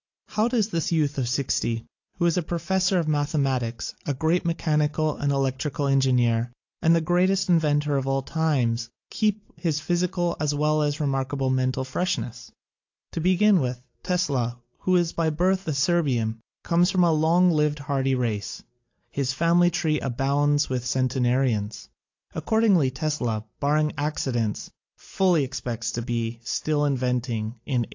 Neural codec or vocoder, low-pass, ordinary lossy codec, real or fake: none; 7.2 kHz; AAC, 48 kbps; real